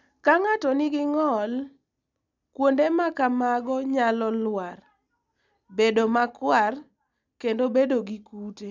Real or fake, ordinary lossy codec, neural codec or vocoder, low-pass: real; none; none; 7.2 kHz